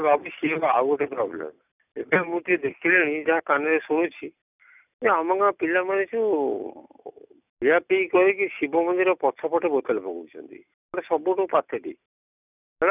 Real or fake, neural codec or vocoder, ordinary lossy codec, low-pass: real; none; none; 3.6 kHz